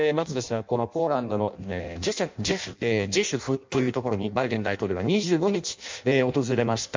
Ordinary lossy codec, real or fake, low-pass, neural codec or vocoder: MP3, 64 kbps; fake; 7.2 kHz; codec, 16 kHz in and 24 kHz out, 0.6 kbps, FireRedTTS-2 codec